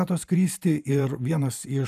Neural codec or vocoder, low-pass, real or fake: vocoder, 44.1 kHz, 128 mel bands every 512 samples, BigVGAN v2; 14.4 kHz; fake